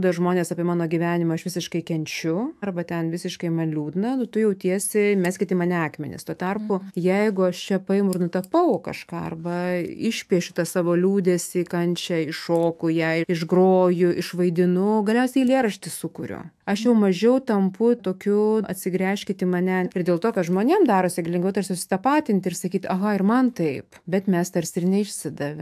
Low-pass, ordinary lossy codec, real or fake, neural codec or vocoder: 14.4 kHz; AAC, 96 kbps; fake; autoencoder, 48 kHz, 128 numbers a frame, DAC-VAE, trained on Japanese speech